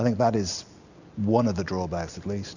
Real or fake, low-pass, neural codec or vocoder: real; 7.2 kHz; none